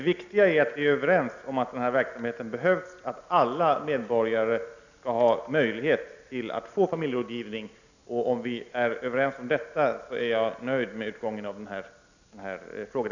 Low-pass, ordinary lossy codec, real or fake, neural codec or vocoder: 7.2 kHz; none; real; none